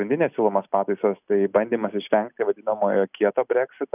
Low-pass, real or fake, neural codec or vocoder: 3.6 kHz; real; none